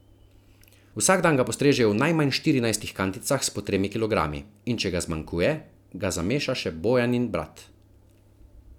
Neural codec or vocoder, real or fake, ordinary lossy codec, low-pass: none; real; none; 19.8 kHz